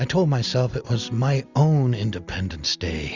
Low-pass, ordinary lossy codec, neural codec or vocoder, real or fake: 7.2 kHz; Opus, 64 kbps; none; real